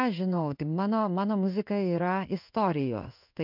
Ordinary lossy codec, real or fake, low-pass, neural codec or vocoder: MP3, 48 kbps; fake; 5.4 kHz; codec, 16 kHz in and 24 kHz out, 1 kbps, XY-Tokenizer